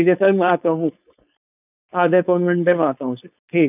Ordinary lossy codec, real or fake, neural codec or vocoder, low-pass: none; fake; codec, 16 kHz, 4.8 kbps, FACodec; 3.6 kHz